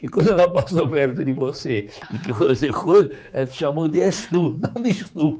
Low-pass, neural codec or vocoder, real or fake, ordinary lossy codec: none; codec, 16 kHz, 4 kbps, X-Codec, HuBERT features, trained on general audio; fake; none